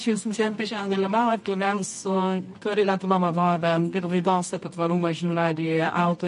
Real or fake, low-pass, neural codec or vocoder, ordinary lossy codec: fake; 10.8 kHz; codec, 24 kHz, 0.9 kbps, WavTokenizer, medium music audio release; MP3, 48 kbps